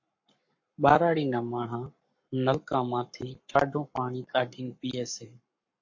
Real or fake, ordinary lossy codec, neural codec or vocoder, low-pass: fake; MP3, 48 kbps; codec, 44.1 kHz, 7.8 kbps, Pupu-Codec; 7.2 kHz